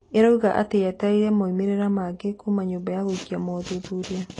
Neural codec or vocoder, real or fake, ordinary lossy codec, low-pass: none; real; AAC, 32 kbps; 10.8 kHz